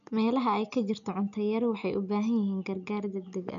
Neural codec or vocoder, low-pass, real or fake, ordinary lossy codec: none; 7.2 kHz; real; none